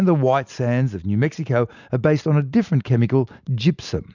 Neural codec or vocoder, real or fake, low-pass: none; real; 7.2 kHz